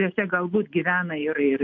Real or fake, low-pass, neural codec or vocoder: real; 7.2 kHz; none